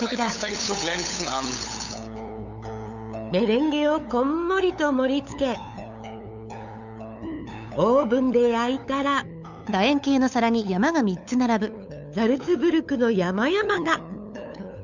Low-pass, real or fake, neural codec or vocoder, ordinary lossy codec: 7.2 kHz; fake; codec, 16 kHz, 8 kbps, FunCodec, trained on LibriTTS, 25 frames a second; none